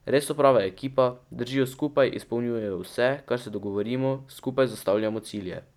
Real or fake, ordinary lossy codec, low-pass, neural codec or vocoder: real; none; 19.8 kHz; none